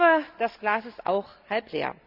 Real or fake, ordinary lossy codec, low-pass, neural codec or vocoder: real; Opus, 64 kbps; 5.4 kHz; none